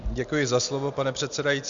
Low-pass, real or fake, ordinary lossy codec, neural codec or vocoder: 7.2 kHz; real; Opus, 64 kbps; none